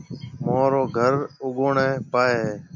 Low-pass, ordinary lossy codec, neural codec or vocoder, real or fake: 7.2 kHz; MP3, 64 kbps; none; real